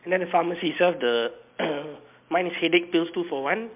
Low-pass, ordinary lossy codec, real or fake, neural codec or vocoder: 3.6 kHz; MP3, 32 kbps; real; none